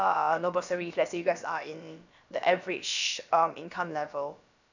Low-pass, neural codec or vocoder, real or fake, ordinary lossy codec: 7.2 kHz; codec, 16 kHz, about 1 kbps, DyCAST, with the encoder's durations; fake; none